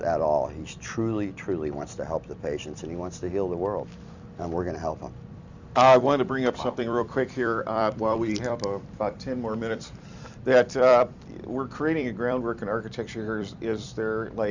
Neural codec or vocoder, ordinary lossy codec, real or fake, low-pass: vocoder, 44.1 kHz, 128 mel bands every 256 samples, BigVGAN v2; Opus, 64 kbps; fake; 7.2 kHz